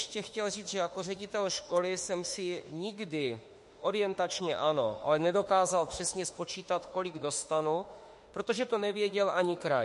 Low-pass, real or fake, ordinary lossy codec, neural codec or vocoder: 14.4 kHz; fake; MP3, 48 kbps; autoencoder, 48 kHz, 32 numbers a frame, DAC-VAE, trained on Japanese speech